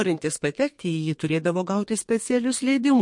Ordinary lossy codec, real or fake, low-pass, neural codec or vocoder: MP3, 48 kbps; fake; 10.8 kHz; codec, 32 kHz, 1.9 kbps, SNAC